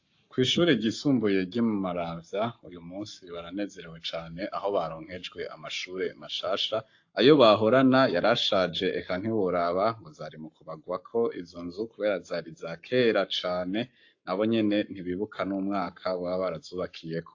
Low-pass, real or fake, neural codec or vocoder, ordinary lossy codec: 7.2 kHz; fake; codec, 44.1 kHz, 7.8 kbps, Pupu-Codec; AAC, 48 kbps